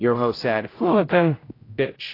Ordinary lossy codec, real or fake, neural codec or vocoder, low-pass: AAC, 24 kbps; fake; codec, 16 kHz, 0.5 kbps, X-Codec, HuBERT features, trained on general audio; 5.4 kHz